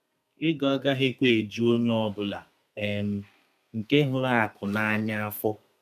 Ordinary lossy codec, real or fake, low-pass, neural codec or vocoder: MP3, 96 kbps; fake; 14.4 kHz; codec, 32 kHz, 1.9 kbps, SNAC